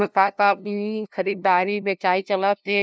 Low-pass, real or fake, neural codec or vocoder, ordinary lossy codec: none; fake; codec, 16 kHz, 0.5 kbps, FunCodec, trained on LibriTTS, 25 frames a second; none